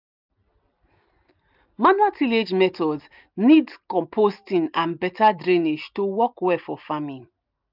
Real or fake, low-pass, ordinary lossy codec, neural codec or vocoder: real; 5.4 kHz; none; none